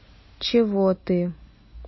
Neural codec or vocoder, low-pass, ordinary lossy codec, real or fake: none; 7.2 kHz; MP3, 24 kbps; real